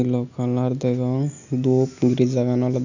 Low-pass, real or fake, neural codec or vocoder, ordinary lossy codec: 7.2 kHz; real; none; none